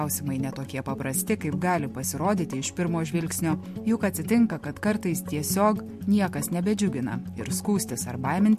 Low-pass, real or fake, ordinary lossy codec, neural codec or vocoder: 14.4 kHz; real; MP3, 64 kbps; none